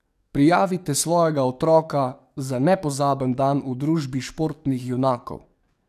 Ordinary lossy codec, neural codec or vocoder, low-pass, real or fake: none; codec, 44.1 kHz, 7.8 kbps, DAC; 14.4 kHz; fake